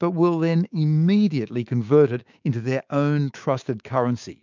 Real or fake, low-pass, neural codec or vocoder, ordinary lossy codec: fake; 7.2 kHz; codec, 16 kHz, 6 kbps, DAC; MP3, 64 kbps